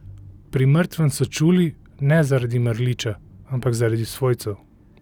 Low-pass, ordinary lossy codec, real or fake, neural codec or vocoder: 19.8 kHz; none; real; none